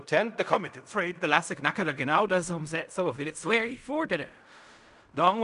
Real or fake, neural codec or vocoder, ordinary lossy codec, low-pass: fake; codec, 16 kHz in and 24 kHz out, 0.4 kbps, LongCat-Audio-Codec, fine tuned four codebook decoder; none; 10.8 kHz